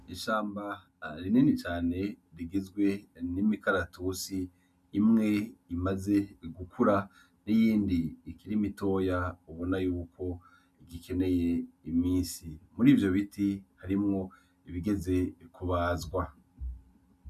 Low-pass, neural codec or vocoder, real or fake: 14.4 kHz; none; real